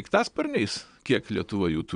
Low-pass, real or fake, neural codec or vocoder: 9.9 kHz; fake; vocoder, 22.05 kHz, 80 mel bands, Vocos